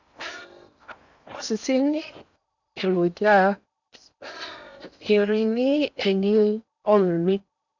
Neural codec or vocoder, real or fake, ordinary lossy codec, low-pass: codec, 16 kHz in and 24 kHz out, 0.8 kbps, FocalCodec, streaming, 65536 codes; fake; none; 7.2 kHz